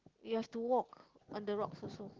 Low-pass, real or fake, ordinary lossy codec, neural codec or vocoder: 7.2 kHz; real; Opus, 16 kbps; none